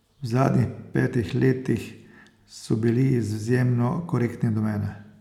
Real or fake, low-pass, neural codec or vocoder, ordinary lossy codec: real; 19.8 kHz; none; none